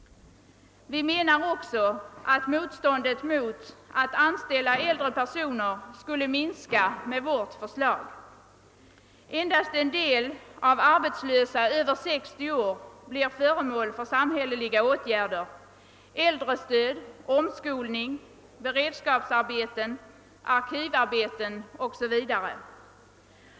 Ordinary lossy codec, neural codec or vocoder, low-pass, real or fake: none; none; none; real